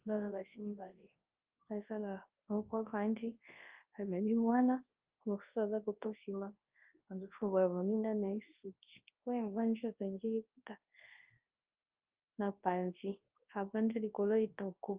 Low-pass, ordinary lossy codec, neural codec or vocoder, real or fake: 3.6 kHz; Opus, 32 kbps; codec, 24 kHz, 0.9 kbps, WavTokenizer, large speech release; fake